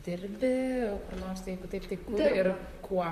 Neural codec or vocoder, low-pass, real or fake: vocoder, 44.1 kHz, 128 mel bands, Pupu-Vocoder; 14.4 kHz; fake